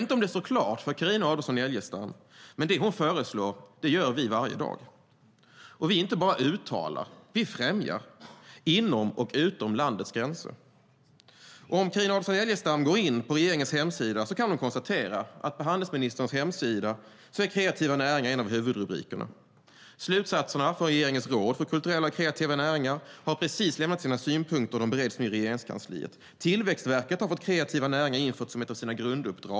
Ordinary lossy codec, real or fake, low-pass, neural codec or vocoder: none; real; none; none